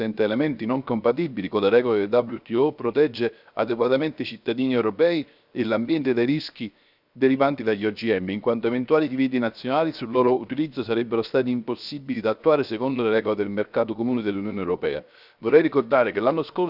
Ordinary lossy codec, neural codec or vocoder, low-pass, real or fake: none; codec, 16 kHz, 0.7 kbps, FocalCodec; 5.4 kHz; fake